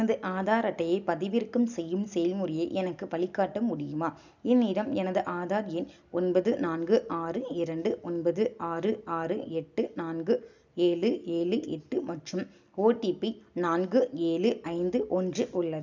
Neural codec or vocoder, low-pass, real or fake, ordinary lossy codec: none; 7.2 kHz; real; none